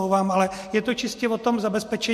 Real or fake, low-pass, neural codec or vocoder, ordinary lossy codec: real; 14.4 kHz; none; MP3, 64 kbps